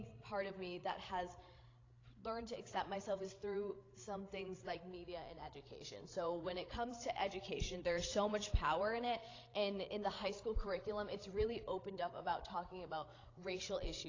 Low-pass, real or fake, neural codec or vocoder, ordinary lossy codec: 7.2 kHz; fake; codec, 16 kHz, 16 kbps, FreqCodec, larger model; AAC, 32 kbps